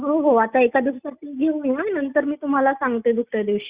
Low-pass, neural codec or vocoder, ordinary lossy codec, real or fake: 3.6 kHz; none; Opus, 64 kbps; real